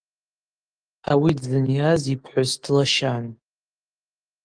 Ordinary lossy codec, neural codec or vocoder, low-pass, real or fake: Opus, 24 kbps; autoencoder, 48 kHz, 128 numbers a frame, DAC-VAE, trained on Japanese speech; 9.9 kHz; fake